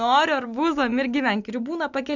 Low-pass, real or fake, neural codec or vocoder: 7.2 kHz; real; none